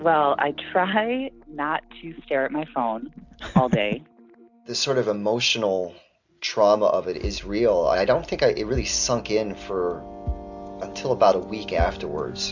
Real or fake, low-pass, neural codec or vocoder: real; 7.2 kHz; none